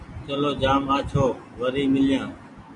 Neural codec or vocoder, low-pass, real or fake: none; 10.8 kHz; real